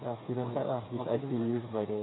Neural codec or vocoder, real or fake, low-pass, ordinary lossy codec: codec, 16 kHz, 8 kbps, FreqCodec, smaller model; fake; 7.2 kHz; AAC, 16 kbps